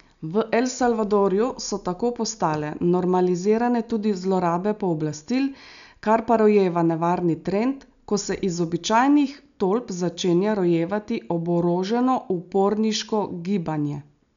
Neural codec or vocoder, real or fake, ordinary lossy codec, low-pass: none; real; none; 7.2 kHz